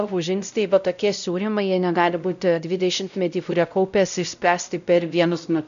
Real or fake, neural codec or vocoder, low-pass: fake; codec, 16 kHz, 0.5 kbps, X-Codec, WavLM features, trained on Multilingual LibriSpeech; 7.2 kHz